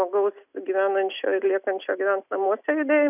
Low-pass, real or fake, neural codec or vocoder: 3.6 kHz; real; none